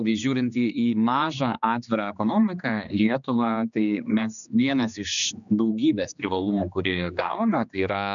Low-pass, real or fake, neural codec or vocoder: 7.2 kHz; fake; codec, 16 kHz, 2 kbps, X-Codec, HuBERT features, trained on general audio